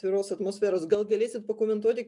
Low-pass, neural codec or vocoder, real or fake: 10.8 kHz; none; real